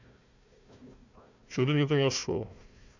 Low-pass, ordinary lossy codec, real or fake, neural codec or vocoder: 7.2 kHz; none; fake; codec, 16 kHz, 1 kbps, FunCodec, trained on Chinese and English, 50 frames a second